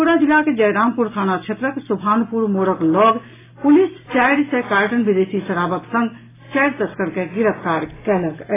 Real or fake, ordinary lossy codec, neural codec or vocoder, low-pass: real; AAC, 16 kbps; none; 3.6 kHz